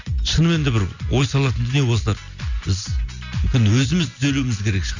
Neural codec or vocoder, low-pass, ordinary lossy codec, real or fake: none; 7.2 kHz; none; real